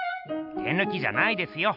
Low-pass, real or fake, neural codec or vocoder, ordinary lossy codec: 5.4 kHz; real; none; none